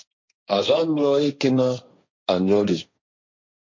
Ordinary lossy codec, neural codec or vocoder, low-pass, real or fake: MP3, 48 kbps; codec, 16 kHz, 1.1 kbps, Voila-Tokenizer; 7.2 kHz; fake